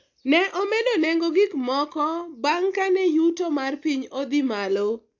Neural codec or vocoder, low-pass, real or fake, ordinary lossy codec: none; 7.2 kHz; real; AAC, 48 kbps